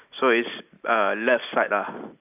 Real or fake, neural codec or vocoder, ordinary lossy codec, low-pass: real; none; none; 3.6 kHz